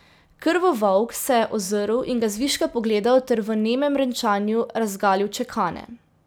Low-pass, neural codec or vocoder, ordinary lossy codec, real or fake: none; none; none; real